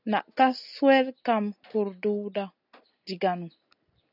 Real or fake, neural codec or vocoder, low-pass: real; none; 5.4 kHz